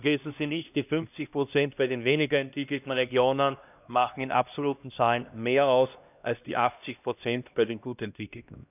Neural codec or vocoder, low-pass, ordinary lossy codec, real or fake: codec, 16 kHz, 1 kbps, X-Codec, HuBERT features, trained on LibriSpeech; 3.6 kHz; none; fake